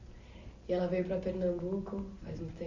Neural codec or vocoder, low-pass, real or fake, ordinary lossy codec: none; 7.2 kHz; real; Opus, 64 kbps